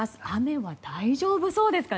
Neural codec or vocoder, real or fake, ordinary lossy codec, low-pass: none; real; none; none